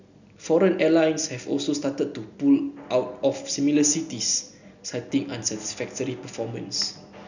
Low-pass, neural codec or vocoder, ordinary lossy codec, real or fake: 7.2 kHz; none; none; real